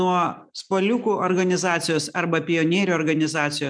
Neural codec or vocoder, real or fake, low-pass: none; real; 9.9 kHz